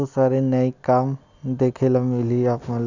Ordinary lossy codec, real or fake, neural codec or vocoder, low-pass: none; real; none; 7.2 kHz